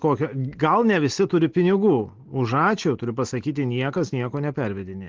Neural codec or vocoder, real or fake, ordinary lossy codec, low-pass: none; real; Opus, 16 kbps; 7.2 kHz